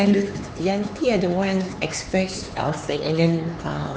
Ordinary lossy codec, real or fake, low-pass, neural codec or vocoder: none; fake; none; codec, 16 kHz, 4 kbps, X-Codec, HuBERT features, trained on LibriSpeech